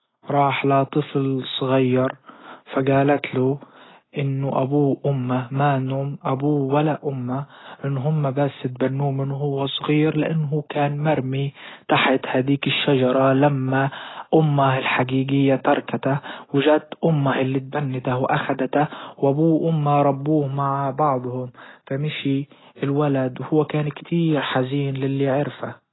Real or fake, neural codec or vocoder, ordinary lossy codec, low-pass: real; none; AAC, 16 kbps; 7.2 kHz